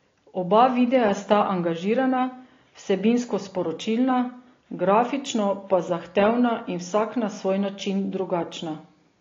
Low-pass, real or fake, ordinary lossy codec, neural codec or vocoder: 7.2 kHz; real; AAC, 32 kbps; none